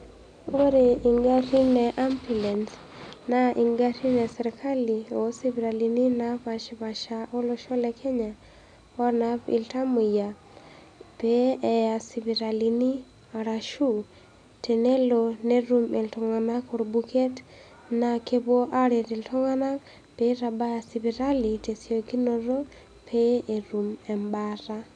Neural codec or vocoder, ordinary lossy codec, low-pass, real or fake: none; none; 9.9 kHz; real